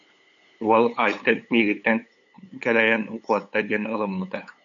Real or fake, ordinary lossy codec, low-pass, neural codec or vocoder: fake; MP3, 96 kbps; 7.2 kHz; codec, 16 kHz, 8 kbps, FunCodec, trained on LibriTTS, 25 frames a second